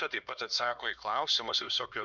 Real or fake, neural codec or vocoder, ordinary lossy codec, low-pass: fake; codec, 16 kHz, 2 kbps, X-Codec, HuBERT features, trained on LibriSpeech; Opus, 64 kbps; 7.2 kHz